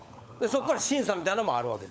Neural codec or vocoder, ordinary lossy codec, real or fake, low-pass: codec, 16 kHz, 16 kbps, FunCodec, trained on LibriTTS, 50 frames a second; none; fake; none